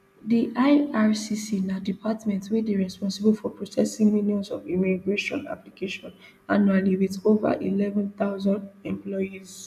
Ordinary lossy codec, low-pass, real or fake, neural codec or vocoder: none; 14.4 kHz; real; none